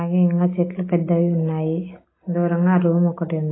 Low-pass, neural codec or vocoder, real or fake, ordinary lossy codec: 7.2 kHz; none; real; AAC, 16 kbps